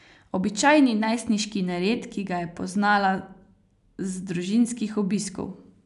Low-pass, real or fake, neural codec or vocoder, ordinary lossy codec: 10.8 kHz; real; none; none